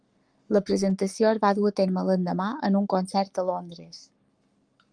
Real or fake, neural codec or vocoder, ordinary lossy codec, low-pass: fake; codec, 44.1 kHz, 7.8 kbps, Pupu-Codec; Opus, 32 kbps; 9.9 kHz